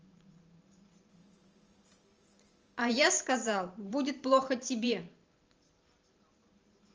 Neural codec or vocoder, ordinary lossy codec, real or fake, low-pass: vocoder, 44.1 kHz, 128 mel bands every 512 samples, BigVGAN v2; Opus, 24 kbps; fake; 7.2 kHz